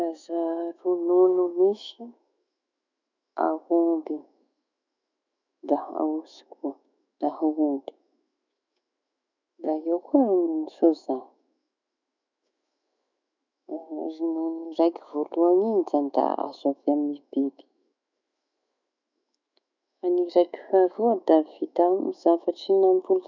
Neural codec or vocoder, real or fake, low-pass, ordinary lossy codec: autoencoder, 48 kHz, 128 numbers a frame, DAC-VAE, trained on Japanese speech; fake; 7.2 kHz; none